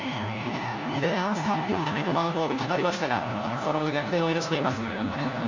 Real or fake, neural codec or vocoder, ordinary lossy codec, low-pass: fake; codec, 16 kHz, 1 kbps, FunCodec, trained on LibriTTS, 50 frames a second; none; 7.2 kHz